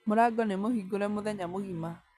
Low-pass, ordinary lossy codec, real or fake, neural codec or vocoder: 14.4 kHz; none; real; none